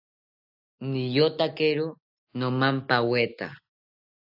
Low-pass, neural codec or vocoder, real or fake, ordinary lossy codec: 5.4 kHz; none; real; AAC, 48 kbps